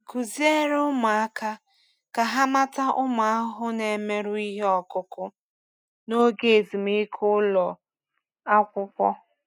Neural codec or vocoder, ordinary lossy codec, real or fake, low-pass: none; none; real; none